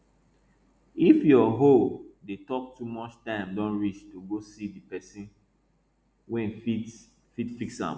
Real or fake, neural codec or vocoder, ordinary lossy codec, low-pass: real; none; none; none